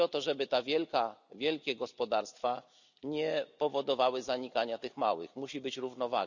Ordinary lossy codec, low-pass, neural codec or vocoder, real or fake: none; 7.2 kHz; none; real